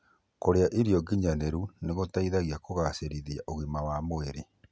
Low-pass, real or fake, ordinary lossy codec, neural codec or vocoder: none; real; none; none